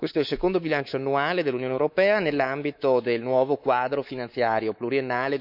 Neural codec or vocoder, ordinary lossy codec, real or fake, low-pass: codec, 24 kHz, 3.1 kbps, DualCodec; none; fake; 5.4 kHz